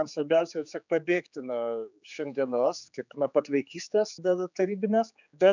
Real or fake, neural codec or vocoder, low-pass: fake; codec, 16 kHz, 4 kbps, X-Codec, HuBERT features, trained on general audio; 7.2 kHz